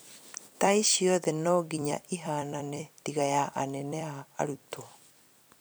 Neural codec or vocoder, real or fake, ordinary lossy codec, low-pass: vocoder, 44.1 kHz, 128 mel bands every 256 samples, BigVGAN v2; fake; none; none